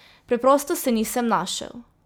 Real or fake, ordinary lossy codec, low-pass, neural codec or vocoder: real; none; none; none